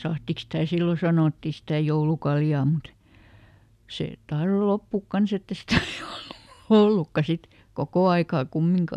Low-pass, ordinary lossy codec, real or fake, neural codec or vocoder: 14.4 kHz; none; real; none